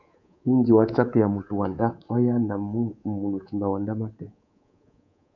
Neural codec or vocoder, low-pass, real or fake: codec, 24 kHz, 3.1 kbps, DualCodec; 7.2 kHz; fake